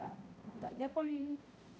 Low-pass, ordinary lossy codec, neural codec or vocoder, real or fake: none; none; codec, 16 kHz, 0.5 kbps, X-Codec, HuBERT features, trained on balanced general audio; fake